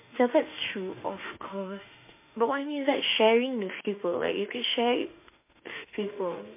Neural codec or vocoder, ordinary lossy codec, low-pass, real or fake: autoencoder, 48 kHz, 32 numbers a frame, DAC-VAE, trained on Japanese speech; MP3, 24 kbps; 3.6 kHz; fake